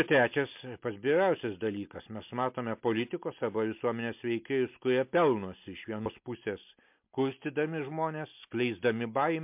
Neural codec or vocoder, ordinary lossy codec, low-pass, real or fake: none; MP3, 32 kbps; 3.6 kHz; real